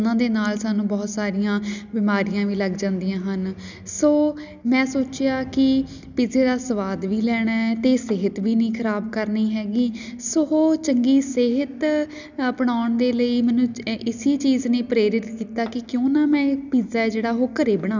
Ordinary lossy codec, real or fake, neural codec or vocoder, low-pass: none; real; none; 7.2 kHz